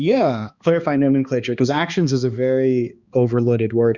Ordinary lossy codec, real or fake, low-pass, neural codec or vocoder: Opus, 64 kbps; fake; 7.2 kHz; codec, 16 kHz, 2 kbps, X-Codec, HuBERT features, trained on balanced general audio